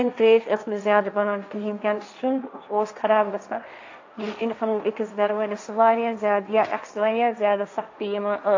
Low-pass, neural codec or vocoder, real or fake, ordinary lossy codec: 7.2 kHz; codec, 16 kHz, 1.1 kbps, Voila-Tokenizer; fake; AAC, 48 kbps